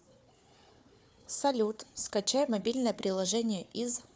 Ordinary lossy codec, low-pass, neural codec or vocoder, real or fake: none; none; codec, 16 kHz, 4 kbps, FunCodec, trained on Chinese and English, 50 frames a second; fake